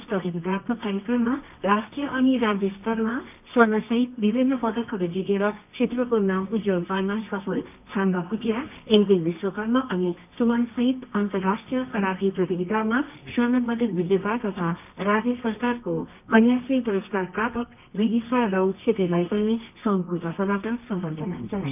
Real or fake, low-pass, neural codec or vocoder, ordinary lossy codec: fake; 3.6 kHz; codec, 24 kHz, 0.9 kbps, WavTokenizer, medium music audio release; none